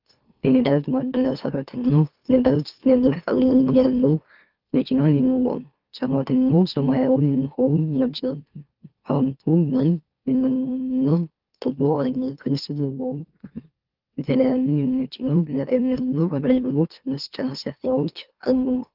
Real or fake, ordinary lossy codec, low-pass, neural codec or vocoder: fake; Opus, 32 kbps; 5.4 kHz; autoencoder, 44.1 kHz, a latent of 192 numbers a frame, MeloTTS